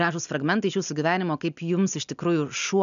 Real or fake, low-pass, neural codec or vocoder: real; 7.2 kHz; none